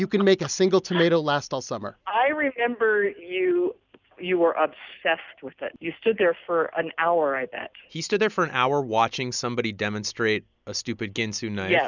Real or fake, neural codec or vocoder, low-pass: real; none; 7.2 kHz